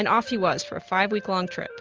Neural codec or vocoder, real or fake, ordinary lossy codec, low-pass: none; real; Opus, 24 kbps; 7.2 kHz